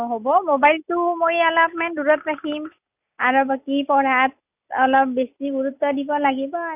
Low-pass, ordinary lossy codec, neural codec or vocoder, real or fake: 3.6 kHz; none; none; real